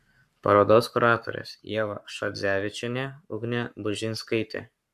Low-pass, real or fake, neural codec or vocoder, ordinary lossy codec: 14.4 kHz; fake; codec, 44.1 kHz, 7.8 kbps, Pupu-Codec; AAC, 96 kbps